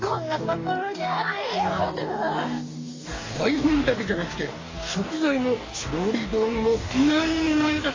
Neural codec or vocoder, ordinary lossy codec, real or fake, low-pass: codec, 44.1 kHz, 2.6 kbps, DAC; AAC, 32 kbps; fake; 7.2 kHz